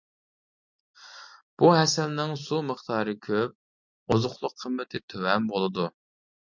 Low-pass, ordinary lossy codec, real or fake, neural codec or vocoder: 7.2 kHz; MP3, 64 kbps; real; none